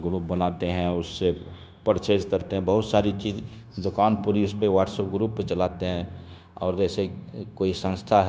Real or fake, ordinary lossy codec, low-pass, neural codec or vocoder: fake; none; none; codec, 16 kHz, 0.9 kbps, LongCat-Audio-Codec